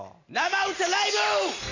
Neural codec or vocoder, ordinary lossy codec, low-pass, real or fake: none; none; 7.2 kHz; real